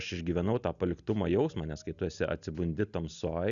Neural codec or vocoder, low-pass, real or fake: none; 7.2 kHz; real